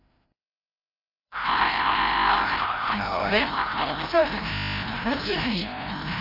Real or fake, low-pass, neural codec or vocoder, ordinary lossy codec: fake; 5.4 kHz; codec, 16 kHz, 0.5 kbps, FreqCodec, larger model; AAC, 24 kbps